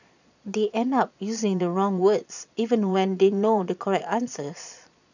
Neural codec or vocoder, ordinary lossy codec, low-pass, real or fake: vocoder, 22.05 kHz, 80 mel bands, Vocos; none; 7.2 kHz; fake